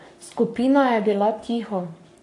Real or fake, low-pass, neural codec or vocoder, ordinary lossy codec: fake; 10.8 kHz; codec, 44.1 kHz, 7.8 kbps, Pupu-Codec; AAC, 48 kbps